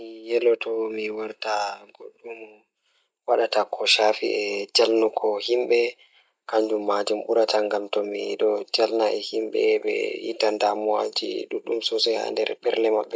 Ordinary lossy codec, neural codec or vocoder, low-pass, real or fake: none; none; none; real